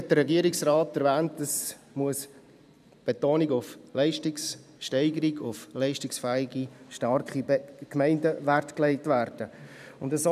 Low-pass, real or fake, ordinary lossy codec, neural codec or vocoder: 14.4 kHz; fake; none; vocoder, 44.1 kHz, 128 mel bands every 512 samples, BigVGAN v2